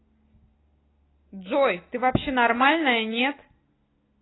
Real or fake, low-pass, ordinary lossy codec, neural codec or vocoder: real; 7.2 kHz; AAC, 16 kbps; none